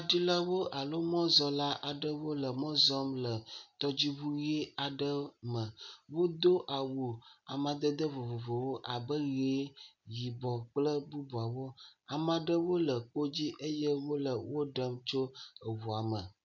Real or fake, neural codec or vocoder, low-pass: real; none; 7.2 kHz